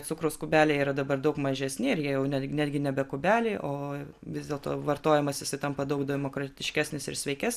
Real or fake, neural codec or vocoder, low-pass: real; none; 14.4 kHz